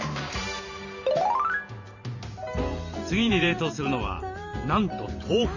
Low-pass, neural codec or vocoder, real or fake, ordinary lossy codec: 7.2 kHz; vocoder, 44.1 kHz, 128 mel bands every 512 samples, BigVGAN v2; fake; none